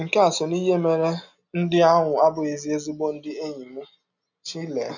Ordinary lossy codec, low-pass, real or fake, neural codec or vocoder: none; 7.2 kHz; real; none